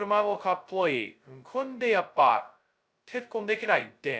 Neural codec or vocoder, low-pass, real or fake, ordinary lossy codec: codec, 16 kHz, 0.2 kbps, FocalCodec; none; fake; none